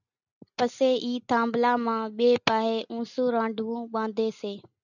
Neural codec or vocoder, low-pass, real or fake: none; 7.2 kHz; real